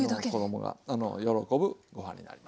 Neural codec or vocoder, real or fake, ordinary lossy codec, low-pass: none; real; none; none